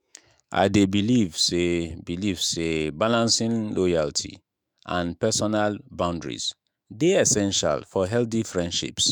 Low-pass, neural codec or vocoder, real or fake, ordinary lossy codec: none; autoencoder, 48 kHz, 128 numbers a frame, DAC-VAE, trained on Japanese speech; fake; none